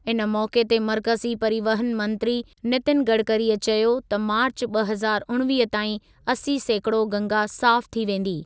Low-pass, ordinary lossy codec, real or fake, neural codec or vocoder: none; none; real; none